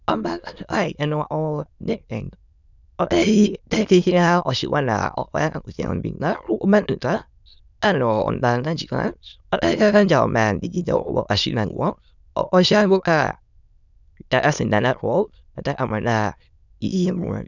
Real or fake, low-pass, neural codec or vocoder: fake; 7.2 kHz; autoencoder, 22.05 kHz, a latent of 192 numbers a frame, VITS, trained on many speakers